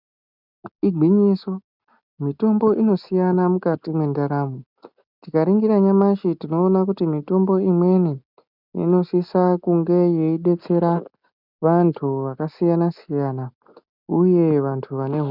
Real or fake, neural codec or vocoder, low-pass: real; none; 5.4 kHz